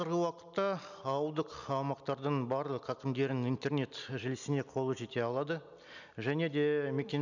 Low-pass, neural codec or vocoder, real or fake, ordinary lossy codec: 7.2 kHz; none; real; none